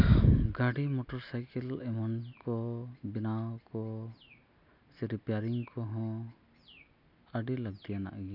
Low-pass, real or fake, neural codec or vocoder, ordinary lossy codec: 5.4 kHz; real; none; none